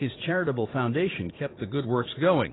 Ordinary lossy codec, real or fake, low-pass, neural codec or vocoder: AAC, 16 kbps; fake; 7.2 kHz; vocoder, 44.1 kHz, 80 mel bands, Vocos